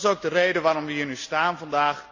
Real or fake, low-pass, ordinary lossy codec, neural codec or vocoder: real; 7.2 kHz; none; none